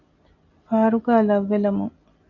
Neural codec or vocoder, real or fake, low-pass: none; real; 7.2 kHz